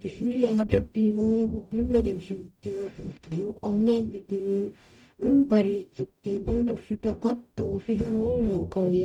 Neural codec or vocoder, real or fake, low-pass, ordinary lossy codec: codec, 44.1 kHz, 0.9 kbps, DAC; fake; 19.8 kHz; none